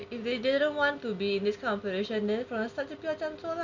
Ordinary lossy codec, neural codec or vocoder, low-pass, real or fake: none; none; 7.2 kHz; real